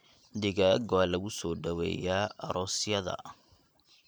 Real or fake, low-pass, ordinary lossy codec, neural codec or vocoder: fake; none; none; vocoder, 44.1 kHz, 128 mel bands every 512 samples, BigVGAN v2